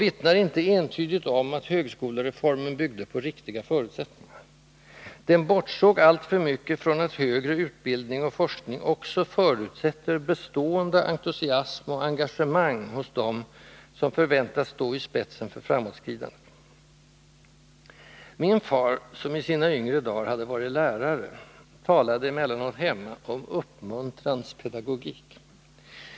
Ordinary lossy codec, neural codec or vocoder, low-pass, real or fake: none; none; none; real